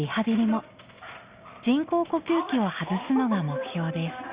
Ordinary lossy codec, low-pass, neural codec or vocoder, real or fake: Opus, 64 kbps; 3.6 kHz; none; real